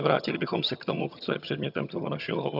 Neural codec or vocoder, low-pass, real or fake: vocoder, 22.05 kHz, 80 mel bands, HiFi-GAN; 5.4 kHz; fake